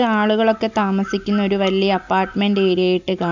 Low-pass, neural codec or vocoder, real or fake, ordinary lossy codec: 7.2 kHz; none; real; none